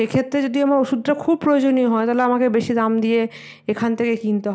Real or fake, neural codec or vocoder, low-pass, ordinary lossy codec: real; none; none; none